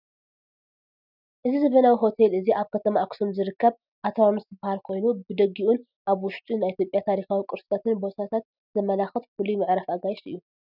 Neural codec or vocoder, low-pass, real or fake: none; 5.4 kHz; real